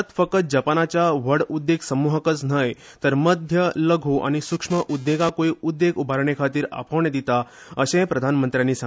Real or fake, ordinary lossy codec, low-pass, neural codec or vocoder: real; none; none; none